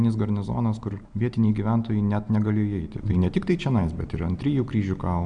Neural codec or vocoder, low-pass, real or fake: none; 9.9 kHz; real